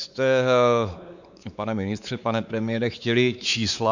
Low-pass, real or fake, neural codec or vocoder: 7.2 kHz; fake; codec, 16 kHz, 4 kbps, X-Codec, WavLM features, trained on Multilingual LibriSpeech